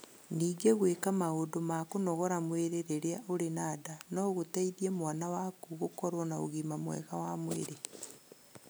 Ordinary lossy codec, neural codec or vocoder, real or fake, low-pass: none; none; real; none